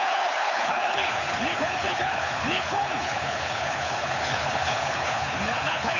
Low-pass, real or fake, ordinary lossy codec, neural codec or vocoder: 7.2 kHz; fake; none; codec, 24 kHz, 6 kbps, HILCodec